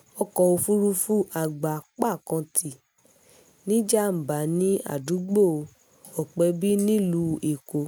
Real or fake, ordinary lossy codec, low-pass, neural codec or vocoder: real; none; none; none